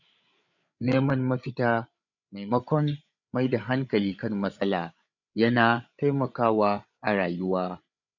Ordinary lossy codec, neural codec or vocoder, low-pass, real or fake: none; codec, 16 kHz, 8 kbps, FreqCodec, larger model; 7.2 kHz; fake